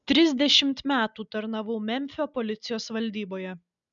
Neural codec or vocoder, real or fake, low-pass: none; real; 7.2 kHz